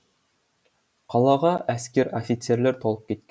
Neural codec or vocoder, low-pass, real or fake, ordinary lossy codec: none; none; real; none